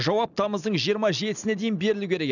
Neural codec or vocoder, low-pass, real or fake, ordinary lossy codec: none; 7.2 kHz; real; none